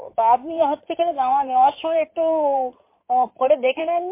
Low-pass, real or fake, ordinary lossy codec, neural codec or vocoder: 3.6 kHz; fake; MP3, 24 kbps; codec, 16 kHz in and 24 kHz out, 2.2 kbps, FireRedTTS-2 codec